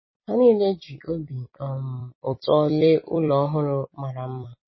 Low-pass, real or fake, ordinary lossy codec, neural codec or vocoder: 7.2 kHz; fake; MP3, 24 kbps; vocoder, 44.1 kHz, 128 mel bands every 256 samples, BigVGAN v2